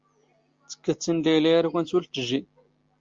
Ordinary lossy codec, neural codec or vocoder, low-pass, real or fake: Opus, 32 kbps; none; 7.2 kHz; real